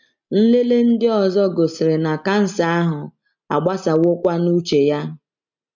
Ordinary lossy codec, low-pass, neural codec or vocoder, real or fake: MP3, 64 kbps; 7.2 kHz; none; real